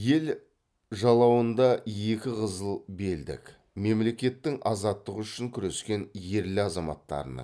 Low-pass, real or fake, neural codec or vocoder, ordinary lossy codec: none; real; none; none